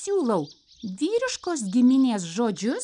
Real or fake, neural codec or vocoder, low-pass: real; none; 9.9 kHz